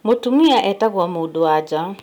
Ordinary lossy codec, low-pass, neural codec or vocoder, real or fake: none; 19.8 kHz; none; real